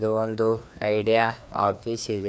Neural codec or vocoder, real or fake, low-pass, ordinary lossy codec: codec, 16 kHz, 2 kbps, FreqCodec, larger model; fake; none; none